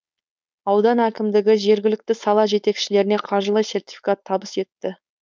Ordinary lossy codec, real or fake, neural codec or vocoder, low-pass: none; fake; codec, 16 kHz, 4.8 kbps, FACodec; none